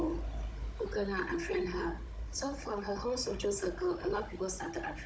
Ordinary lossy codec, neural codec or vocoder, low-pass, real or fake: none; codec, 16 kHz, 16 kbps, FunCodec, trained on Chinese and English, 50 frames a second; none; fake